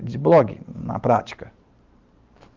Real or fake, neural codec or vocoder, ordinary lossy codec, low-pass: fake; vocoder, 44.1 kHz, 128 mel bands every 512 samples, BigVGAN v2; Opus, 32 kbps; 7.2 kHz